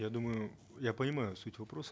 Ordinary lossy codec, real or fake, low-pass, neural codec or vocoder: none; real; none; none